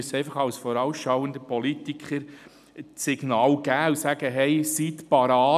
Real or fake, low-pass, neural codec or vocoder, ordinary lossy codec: real; 14.4 kHz; none; none